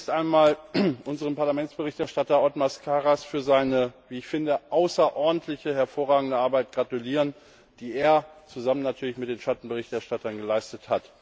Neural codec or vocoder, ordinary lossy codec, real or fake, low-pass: none; none; real; none